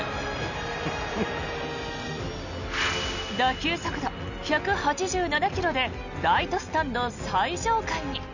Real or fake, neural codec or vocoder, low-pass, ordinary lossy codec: real; none; 7.2 kHz; none